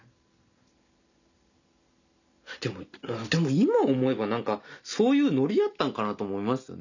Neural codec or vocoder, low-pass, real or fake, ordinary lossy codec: none; 7.2 kHz; real; none